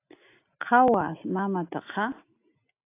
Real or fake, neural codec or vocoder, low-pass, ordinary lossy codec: real; none; 3.6 kHz; AAC, 24 kbps